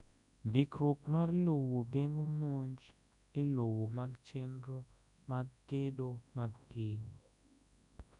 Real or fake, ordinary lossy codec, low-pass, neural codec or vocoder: fake; none; 10.8 kHz; codec, 24 kHz, 0.9 kbps, WavTokenizer, large speech release